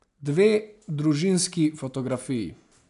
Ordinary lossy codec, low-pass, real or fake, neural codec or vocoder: none; 10.8 kHz; real; none